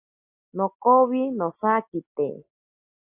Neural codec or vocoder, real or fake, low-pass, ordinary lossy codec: none; real; 3.6 kHz; AAC, 24 kbps